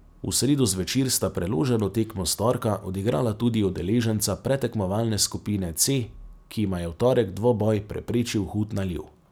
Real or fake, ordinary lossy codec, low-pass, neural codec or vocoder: real; none; none; none